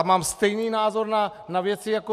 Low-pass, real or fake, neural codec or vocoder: 14.4 kHz; real; none